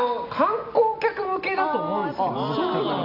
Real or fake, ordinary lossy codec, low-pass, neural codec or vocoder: real; none; 5.4 kHz; none